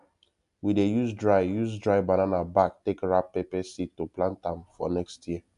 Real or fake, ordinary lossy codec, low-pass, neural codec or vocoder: real; AAC, 96 kbps; 10.8 kHz; none